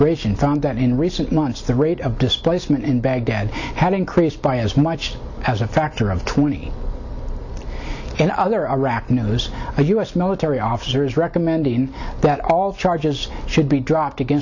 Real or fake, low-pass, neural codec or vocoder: real; 7.2 kHz; none